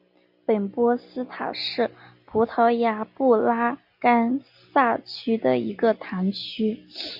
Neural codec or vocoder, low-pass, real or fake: none; 5.4 kHz; real